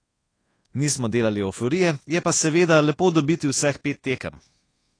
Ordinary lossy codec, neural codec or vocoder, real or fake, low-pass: AAC, 32 kbps; codec, 24 kHz, 1.2 kbps, DualCodec; fake; 9.9 kHz